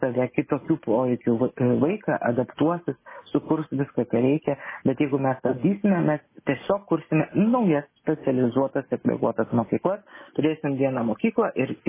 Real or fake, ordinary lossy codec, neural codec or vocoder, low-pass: real; MP3, 16 kbps; none; 3.6 kHz